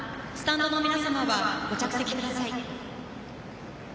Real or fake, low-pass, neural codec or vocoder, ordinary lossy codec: real; none; none; none